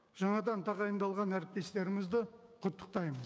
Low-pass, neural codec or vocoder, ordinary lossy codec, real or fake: none; codec, 16 kHz, 6 kbps, DAC; none; fake